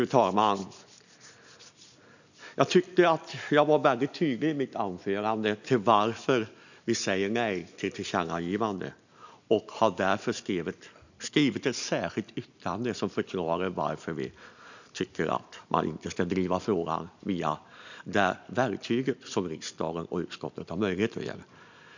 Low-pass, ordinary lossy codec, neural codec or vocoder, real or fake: 7.2 kHz; none; none; real